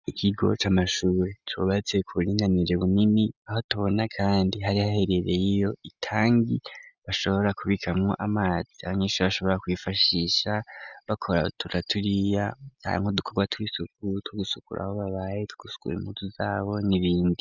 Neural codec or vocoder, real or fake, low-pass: none; real; 7.2 kHz